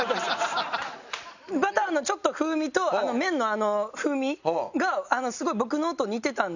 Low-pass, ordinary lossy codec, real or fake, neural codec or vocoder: 7.2 kHz; none; real; none